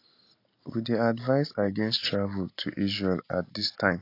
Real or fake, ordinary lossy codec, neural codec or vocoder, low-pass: real; AAC, 32 kbps; none; 5.4 kHz